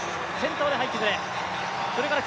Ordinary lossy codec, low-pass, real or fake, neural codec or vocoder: none; none; real; none